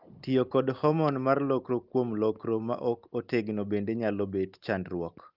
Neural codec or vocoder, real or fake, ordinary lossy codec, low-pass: none; real; Opus, 32 kbps; 5.4 kHz